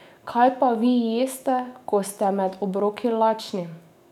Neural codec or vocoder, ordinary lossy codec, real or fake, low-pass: autoencoder, 48 kHz, 128 numbers a frame, DAC-VAE, trained on Japanese speech; none; fake; 19.8 kHz